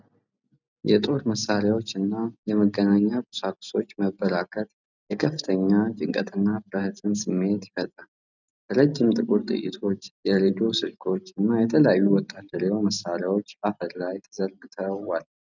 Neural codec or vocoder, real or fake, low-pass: none; real; 7.2 kHz